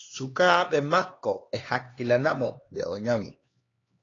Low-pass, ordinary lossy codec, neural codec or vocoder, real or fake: 7.2 kHz; AAC, 32 kbps; codec, 16 kHz, 2 kbps, X-Codec, HuBERT features, trained on LibriSpeech; fake